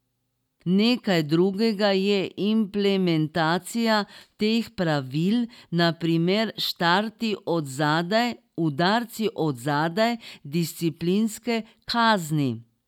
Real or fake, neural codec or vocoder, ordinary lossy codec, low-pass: real; none; none; 19.8 kHz